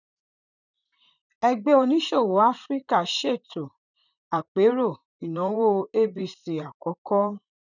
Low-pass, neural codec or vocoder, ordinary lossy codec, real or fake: 7.2 kHz; vocoder, 44.1 kHz, 128 mel bands, Pupu-Vocoder; none; fake